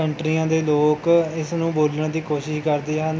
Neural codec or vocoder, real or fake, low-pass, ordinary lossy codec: none; real; none; none